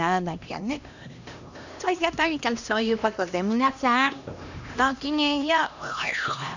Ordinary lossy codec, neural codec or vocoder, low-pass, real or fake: none; codec, 16 kHz, 1 kbps, X-Codec, HuBERT features, trained on LibriSpeech; 7.2 kHz; fake